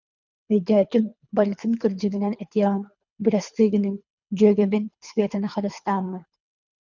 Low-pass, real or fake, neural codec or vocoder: 7.2 kHz; fake; codec, 24 kHz, 3 kbps, HILCodec